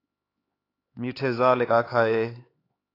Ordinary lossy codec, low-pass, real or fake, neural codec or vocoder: AAC, 24 kbps; 5.4 kHz; fake; codec, 16 kHz, 4 kbps, X-Codec, HuBERT features, trained on LibriSpeech